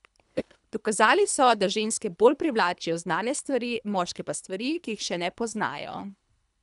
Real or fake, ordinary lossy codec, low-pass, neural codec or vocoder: fake; none; 10.8 kHz; codec, 24 kHz, 3 kbps, HILCodec